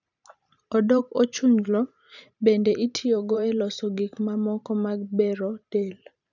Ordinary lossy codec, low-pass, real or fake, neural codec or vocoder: none; 7.2 kHz; fake; vocoder, 44.1 kHz, 128 mel bands every 512 samples, BigVGAN v2